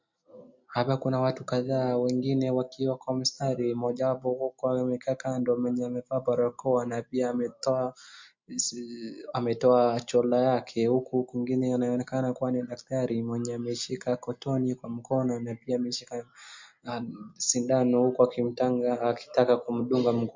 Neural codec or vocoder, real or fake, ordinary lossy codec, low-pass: none; real; MP3, 48 kbps; 7.2 kHz